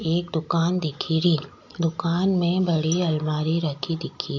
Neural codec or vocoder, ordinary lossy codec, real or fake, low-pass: none; AAC, 48 kbps; real; 7.2 kHz